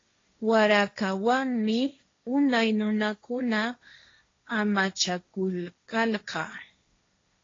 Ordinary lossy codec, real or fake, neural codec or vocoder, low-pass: AAC, 32 kbps; fake; codec, 16 kHz, 1.1 kbps, Voila-Tokenizer; 7.2 kHz